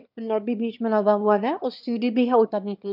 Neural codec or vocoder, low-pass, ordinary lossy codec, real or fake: autoencoder, 22.05 kHz, a latent of 192 numbers a frame, VITS, trained on one speaker; 5.4 kHz; none; fake